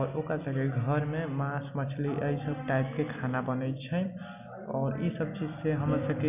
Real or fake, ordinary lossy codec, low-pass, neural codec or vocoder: real; none; 3.6 kHz; none